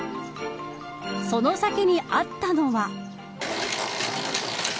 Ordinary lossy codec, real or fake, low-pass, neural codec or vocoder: none; real; none; none